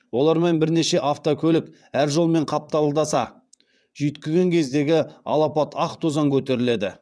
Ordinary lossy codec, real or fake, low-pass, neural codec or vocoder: none; fake; none; vocoder, 22.05 kHz, 80 mel bands, WaveNeXt